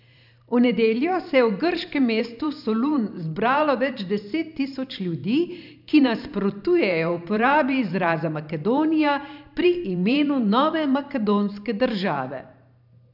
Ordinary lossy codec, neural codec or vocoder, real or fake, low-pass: none; vocoder, 44.1 kHz, 128 mel bands every 512 samples, BigVGAN v2; fake; 5.4 kHz